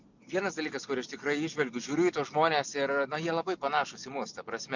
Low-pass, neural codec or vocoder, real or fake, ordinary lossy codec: 7.2 kHz; none; real; MP3, 64 kbps